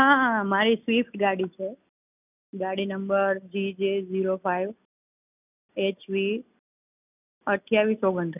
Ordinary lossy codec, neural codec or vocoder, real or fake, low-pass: none; none; real; 3.6 kHz